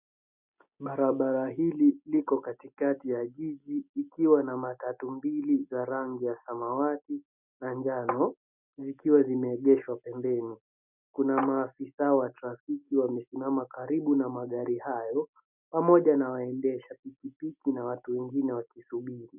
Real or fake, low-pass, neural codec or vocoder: real; 3.6 kHz; none